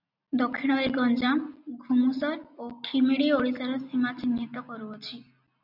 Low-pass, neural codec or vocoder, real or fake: 5.4 kHz; none; real